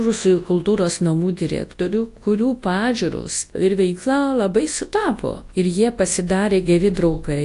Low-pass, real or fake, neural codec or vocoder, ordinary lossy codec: 10.8 kHz; fake; codec, 24 kHz, 0.9 kbps, WavTokenizer, large speech release; AAC, 48 kbps